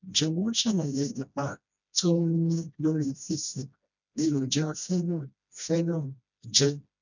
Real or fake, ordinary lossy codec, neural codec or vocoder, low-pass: fake; none; codec, 16 kHz, 1 kbps, FreqCodec, smaller model; 7.2 kHz